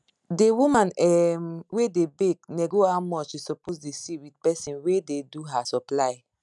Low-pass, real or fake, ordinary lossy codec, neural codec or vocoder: 10.8 kHz; real; none; none